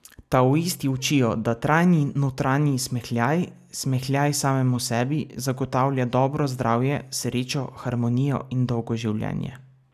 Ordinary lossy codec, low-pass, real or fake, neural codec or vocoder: none; 14.4 kHz; real; none